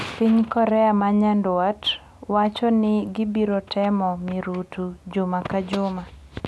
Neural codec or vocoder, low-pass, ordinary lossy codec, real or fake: none; none; none; real